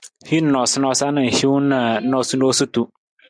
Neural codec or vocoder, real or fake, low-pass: none; real; 9.9 kHz